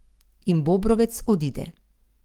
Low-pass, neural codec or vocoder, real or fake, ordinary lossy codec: 19.8 kHz; codec, 44.1 kHz, 7.8 kbps, DAC; fake; Opus, 24 kbps